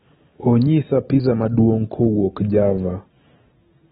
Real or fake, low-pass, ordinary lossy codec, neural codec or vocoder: real; 19.8 kHz; AAC, 16 kbps; none